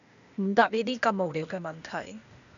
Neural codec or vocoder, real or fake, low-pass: codec, 16 kHz, 0.8 kbps, ZipCodec; fake; 7.2 kHz